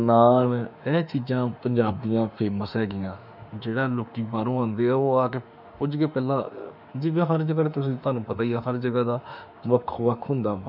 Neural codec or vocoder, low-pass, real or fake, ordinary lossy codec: autoencoder, 48 kHz, 32 numbers a frame, DAC-VAE, trained on Japanese speech; 5.4 kHz; fake; none